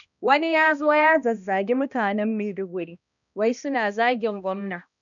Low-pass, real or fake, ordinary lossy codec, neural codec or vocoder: 7.2 kHz; fake; none; codec, 16 kHz, 1 kbps, X-Codec, HuBERT features, trained on balanced general audio